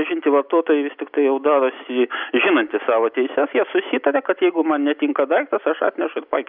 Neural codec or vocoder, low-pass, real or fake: none; 5.4 kHz; real